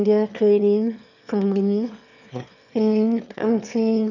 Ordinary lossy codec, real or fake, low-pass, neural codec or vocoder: none; fake; 7.2 kHz; autoencoder, 22.05 kHz, a latent of 192 numbers a frame, VITS, trained on one speaker